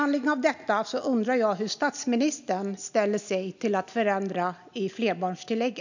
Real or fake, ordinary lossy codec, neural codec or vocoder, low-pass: real; none; none; 7.2 kHz